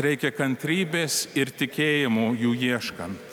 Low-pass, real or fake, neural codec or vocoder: 19.8 kHz; real; none